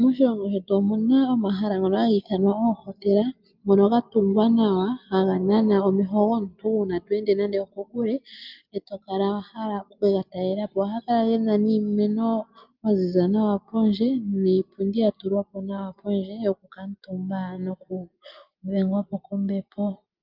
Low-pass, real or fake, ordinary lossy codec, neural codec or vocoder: 5.4 kHz; real; Opus, 24 kbps; none